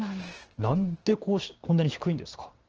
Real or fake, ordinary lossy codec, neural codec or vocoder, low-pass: fake; Opus, 16 kbps; codec, 16 kHz, 6 kbps, DAC; 7.2 kHz